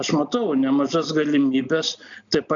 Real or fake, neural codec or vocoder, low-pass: real; none; 7.2 kHz